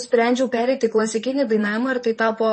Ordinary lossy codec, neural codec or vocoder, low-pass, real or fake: MP3, 32 kbps; vocoder, 44.1 kHz, 128 mel bands, Pupu-Vocoder; 10.8 kHz; fake